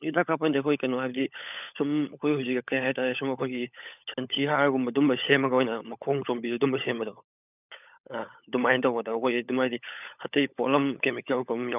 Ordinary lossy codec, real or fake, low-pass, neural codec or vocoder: none; fake; 3.6 kHz; codec, 16 kHz, 16 kbps, FunCodec, trained on LibriTTS, 50 frames a second